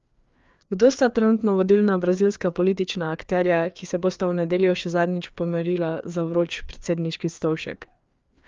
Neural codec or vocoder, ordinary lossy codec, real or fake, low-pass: codec, 16 kHz, 2 kbps, FreqCodec, larger model; Opus, 32 kbps; fake; 7.2 kHz